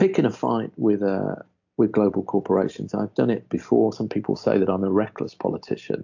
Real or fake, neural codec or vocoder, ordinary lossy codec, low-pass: real; none; AAC, 48 kbps; 7.2 kHz